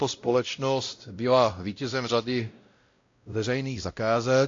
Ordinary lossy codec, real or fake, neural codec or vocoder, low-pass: AAC, 48 kbps; fake; codec, 16 kHz, 0.5 kbps, X-Codec, WavLM features, trained on Multilingual LibriSpeech; 7.2 kHz